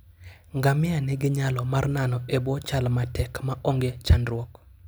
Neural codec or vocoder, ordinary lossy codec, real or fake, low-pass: none; none; real; none